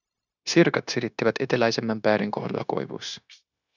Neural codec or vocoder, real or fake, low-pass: codec, 16 kHz, 0.9 kbps, LongCat-Audio-Codec; fake; 7.2 kHz